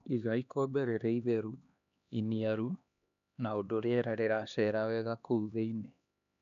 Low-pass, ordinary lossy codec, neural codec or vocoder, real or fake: 7.2 kHz; none; codec, 16 kHz, 2 kbps, X-Codec, HuBERT features, trained on LibriSpeech; fake